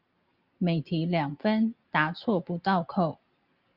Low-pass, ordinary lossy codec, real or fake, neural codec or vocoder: 5.4 kHz; Opus, 64 kbps; real; none